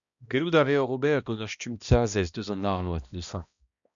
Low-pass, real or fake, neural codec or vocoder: 7.2 kHz; fake; codec, 16 kHz, 1 kbps, X-Codec, HuBERT features, trained on balanced general audio